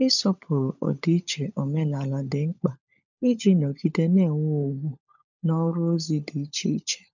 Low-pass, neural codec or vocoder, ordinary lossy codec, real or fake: 7.2 kHz; codec, 16 kHz, 16 kbps, FunCodec, trained on LibriTTS, 50 frames a second; none; fake